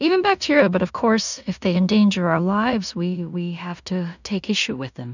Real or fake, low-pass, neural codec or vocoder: fake; 7.2 kHz; codec, 16 kHz in and 24 kHz out, 0.4 kbps, LongCat-Audio-Codec, two codebook decoder